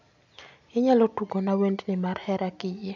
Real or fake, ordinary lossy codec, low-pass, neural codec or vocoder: real; none; 7.2 kHz; none